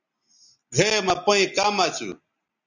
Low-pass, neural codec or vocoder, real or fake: 7.2 kHz; none; real